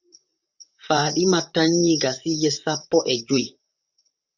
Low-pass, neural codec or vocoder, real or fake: 7.2 kHz; vocoder, 44.1 kHz, 128 mel bands, Pupu-Vocoder; fake